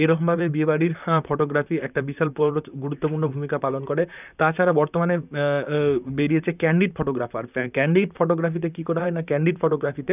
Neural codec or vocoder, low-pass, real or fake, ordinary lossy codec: vocoder, 44.1 kHz, 128 mel bands, Pupu-Vocoder; 3.6 kHz; fake; none